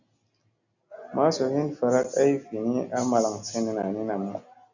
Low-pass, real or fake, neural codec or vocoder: 7.2 kHz; real; none